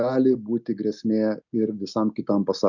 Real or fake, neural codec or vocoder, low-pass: real; none; 7.2 kHz